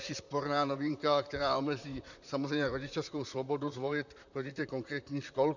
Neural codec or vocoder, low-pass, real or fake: vocoder, 44.1 kHz, 128 mel bands, Pupu-Vocoder; 7.2 kHz; fake